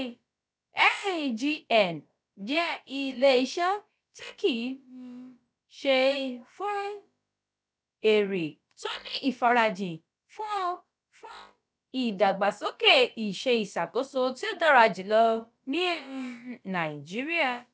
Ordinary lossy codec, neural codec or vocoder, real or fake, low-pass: none; codec, 16 kHz, about 1 kbps, DyCAST, with the encoder's durations; fake; none